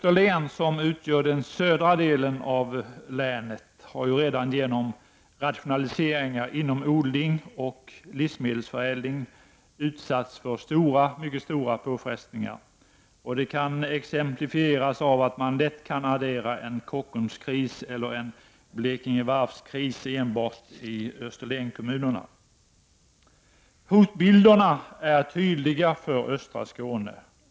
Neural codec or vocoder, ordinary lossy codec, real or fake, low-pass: none; none; real; none